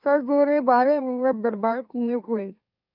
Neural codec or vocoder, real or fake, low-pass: autoencoder, 44.1 kHz, a latent of 192 numbers a frame, MeloTTS; fake; 5.4 kHz